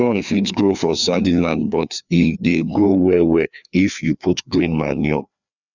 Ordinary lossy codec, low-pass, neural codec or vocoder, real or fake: none; 7.2 kHz; codec, 16 kHz, 2 kbps, FreqCodec, larger model; fake